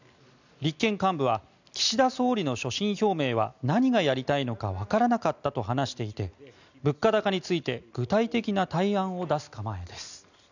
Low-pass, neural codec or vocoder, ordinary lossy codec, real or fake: 7.2 kHz; none; none; real